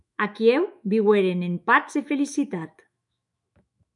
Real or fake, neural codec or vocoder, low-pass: fake; autoencoder, 48 kHz, 128 numbers a frame, DAC-VAE, trained on Japanese speech; 10.8 kHz